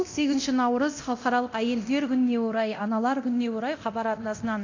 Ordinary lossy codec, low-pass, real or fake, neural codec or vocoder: MP3, 64 kbps; 7.2 kHz; fake; codec, 24 kHz, 0.9 kbps, DualCodec